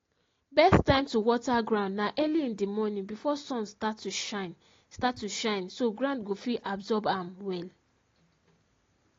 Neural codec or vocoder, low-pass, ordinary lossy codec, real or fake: none; 7.2 kHz; AAC, 32 kbps; real